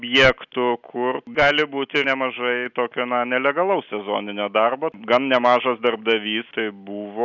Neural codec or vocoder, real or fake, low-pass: none; real; 7.2 kHz